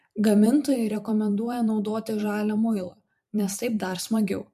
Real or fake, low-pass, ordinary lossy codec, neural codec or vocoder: fake; 14.4 kHz; MP3, 64 kbps; vocoder, 44.1 kHz, 128 mel bands every 512 samples, BigVGAN v2